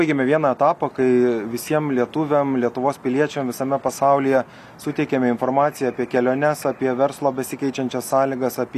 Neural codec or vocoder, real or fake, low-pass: none; real; 14.4 kHz